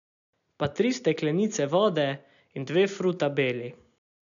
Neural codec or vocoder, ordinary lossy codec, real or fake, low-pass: none; none; real; 7.2 kHz